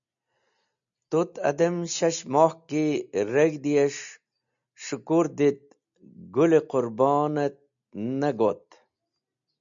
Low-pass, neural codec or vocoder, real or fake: 7.2 kHz; none; real